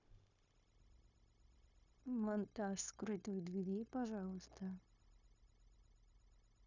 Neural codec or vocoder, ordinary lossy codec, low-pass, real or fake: codec, 16 kHz, 0.9 kbps, LongCat-Audio-Codec; none; 7.2 kHz; fake